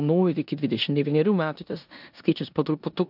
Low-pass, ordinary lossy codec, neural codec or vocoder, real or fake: 5.4 kHz; AAC, 48 kbps; codec, 16 kHz in and 24 kHz out, 0.9 kbps, LongCat-Audio-Codec, four codebook decoder; fake